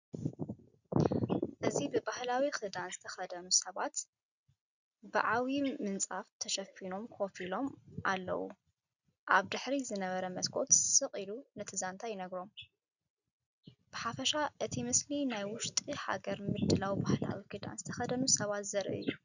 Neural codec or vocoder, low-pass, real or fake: none; 7.2 kHz; real